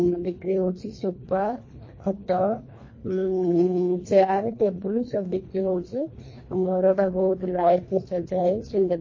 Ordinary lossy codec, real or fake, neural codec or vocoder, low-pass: MP3, 32 kbps; fake; codec, 24 kHz, 1.5 kbps, HILCodec; 7.2 kHz